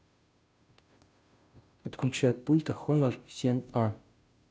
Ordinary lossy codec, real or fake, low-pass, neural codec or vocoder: none; fake; none; codec, 16 kHz, 0.5 kbps, FunCodec, trained on Chinese and English, 25 frames a second